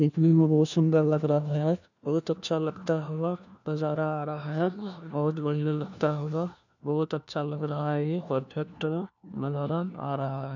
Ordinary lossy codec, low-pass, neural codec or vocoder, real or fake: none; 7.2 kHz; codec, 16 kHz, 1 kbps, FunCodec, trained on LibriTTS, 50 frames a second; fake